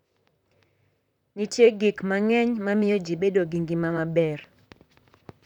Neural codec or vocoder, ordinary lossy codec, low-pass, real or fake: vocoder, 44.1 kHz, 128 mel bands, Pupu-Vocoder; none; 19.8 kHz; fake